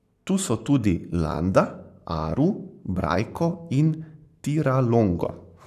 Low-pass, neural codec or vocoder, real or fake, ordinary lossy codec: 14.4 kHz; codec, 44.1 kHz, 7.8 kbps, Pupu-Codec; fake; none